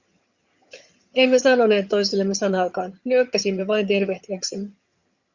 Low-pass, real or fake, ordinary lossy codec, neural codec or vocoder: 7.2 kHz; fake; Opus, 64 kbps; vocoder, 22.05 kHz, 80 mel bands, HiFi-GAN